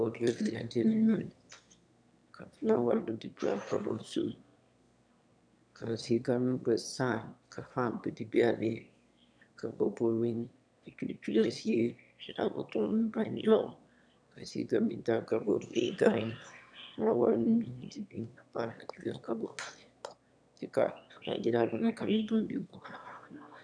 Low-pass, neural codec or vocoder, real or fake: 9.9 kHz; autoencoder, 22.05 kHz, a latent of 192 numbers a frame, VITS, trained on one speaker; fake